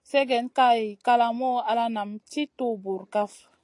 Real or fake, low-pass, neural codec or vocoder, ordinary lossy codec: real; 10.8 kHz; none; AAC, 48 kbps